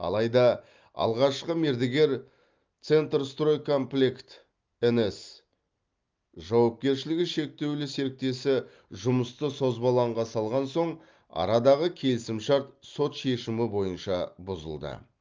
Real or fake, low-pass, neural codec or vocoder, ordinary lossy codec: real; 7.2 kHz; none; Opus, 24 kbps